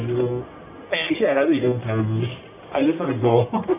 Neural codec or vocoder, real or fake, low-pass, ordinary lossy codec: codec, 44.1 kHz, 1.7 kbps, Pupu-Codec; fake; 3.6 kHz; none